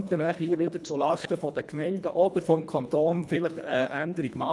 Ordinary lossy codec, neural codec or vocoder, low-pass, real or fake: none; codec, 24 kHz, 1.5 kbps, HILCodec; none; fake